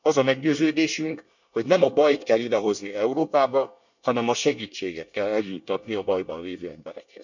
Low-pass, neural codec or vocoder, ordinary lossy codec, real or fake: 7.2 kHz; codec, 24 kHz, 1 kbps, SNAC; none; fake